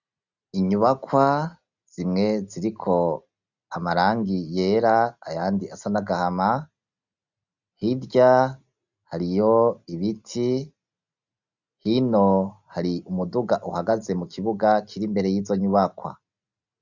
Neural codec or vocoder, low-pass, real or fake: none; 7.2 kHz; real